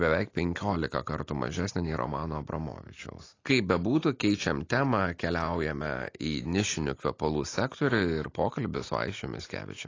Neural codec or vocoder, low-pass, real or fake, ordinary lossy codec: none; 7.2 kHz; real; AAC, 32 kbps